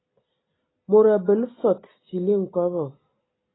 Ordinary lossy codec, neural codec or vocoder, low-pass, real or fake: AAC, 16 kbps; none; 7.2 kHz; real